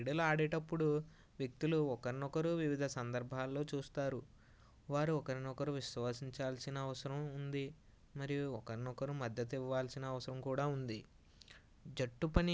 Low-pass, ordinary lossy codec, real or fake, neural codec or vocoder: none; none; real; none